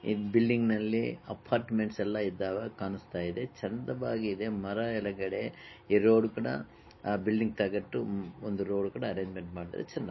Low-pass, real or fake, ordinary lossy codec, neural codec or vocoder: 7.2 kHz; real; MP3, 24 kbps; none